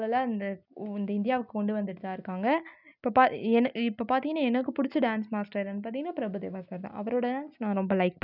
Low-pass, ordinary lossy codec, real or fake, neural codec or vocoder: 5.4 kHz; none; real; none